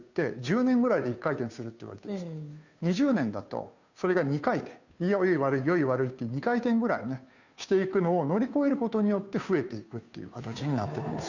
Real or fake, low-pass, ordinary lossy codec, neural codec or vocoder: fake; 7.2 kHz; none; codec, 16 kHz, 2 kbps, FunCodec, trained on Chinese and English, 25 frames a second